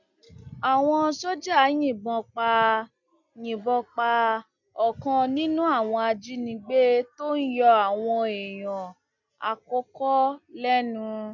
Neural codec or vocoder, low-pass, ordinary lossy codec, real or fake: none; 7.2 kHz; none; real